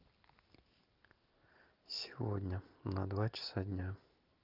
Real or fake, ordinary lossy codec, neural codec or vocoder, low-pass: real; Opus, 24 kbps; none; 5.4 kHz